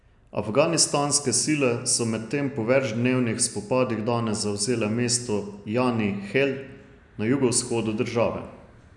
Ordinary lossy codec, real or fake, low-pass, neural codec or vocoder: none; real; 10.8 kHz; none